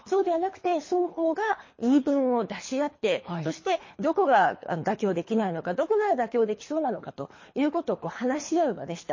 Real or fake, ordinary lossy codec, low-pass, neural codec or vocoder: fake; MP3, 32 kbps; 7.2 kHz; codec, 24 kHz, 3 kbps, HILCodec